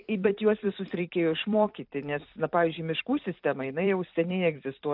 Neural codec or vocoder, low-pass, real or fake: vocoder, 44.1 kHz, 128 mel bands every 256 samples, BigVGAN v2; 5.4 kHz; fake